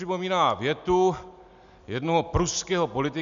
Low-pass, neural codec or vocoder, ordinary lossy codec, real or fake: 7.2 kHz; none; MP3, 96 kbps; real